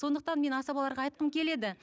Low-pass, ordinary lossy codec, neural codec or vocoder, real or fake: none; none; none; real